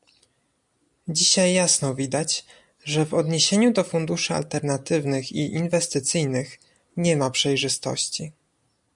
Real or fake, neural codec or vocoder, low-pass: real; none; 10.8 kHz